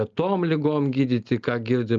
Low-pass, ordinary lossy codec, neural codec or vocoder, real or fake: 7.2 kHz; Opus, 32 kbps; none; real